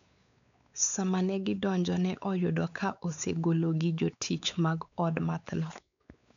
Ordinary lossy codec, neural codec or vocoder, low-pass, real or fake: MP3, 96 kbps; codec, 16 kHz, 4 kbps, X-Codec, WavLM features, trained on Multilingual LibriSpeech; 7.2 kHz; fake